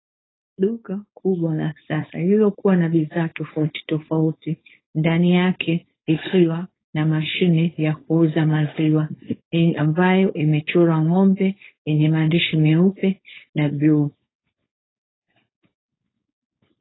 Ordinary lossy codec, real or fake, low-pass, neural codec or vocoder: AAC, 16 kbps; fake; 7.2 kHz; codec, 16 kHz, 4.8 kbps, FACodec